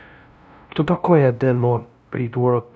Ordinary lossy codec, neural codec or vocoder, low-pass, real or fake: none; codec, 16 kHz, 0.5 kbps, FunCodec, trained on LibriTTS, 25 frames a second; none; fake